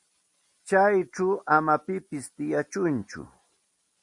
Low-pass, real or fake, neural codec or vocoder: 10.8 kHz; real; none